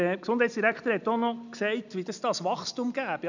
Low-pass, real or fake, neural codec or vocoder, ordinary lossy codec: 7.2 kHz; real; none; none